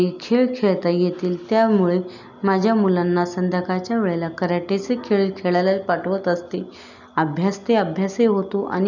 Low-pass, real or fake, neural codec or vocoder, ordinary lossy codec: 7.2 kHz; real; none; none